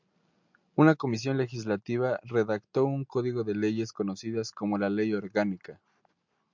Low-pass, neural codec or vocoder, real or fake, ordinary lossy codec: 7.2 kHz; none; real; MP3, 64 kbps